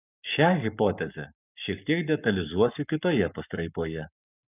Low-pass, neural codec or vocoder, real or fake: 3.6 kHz; codec, 44.1 kHz, 7.8 kbps, Pupu-Codec; fake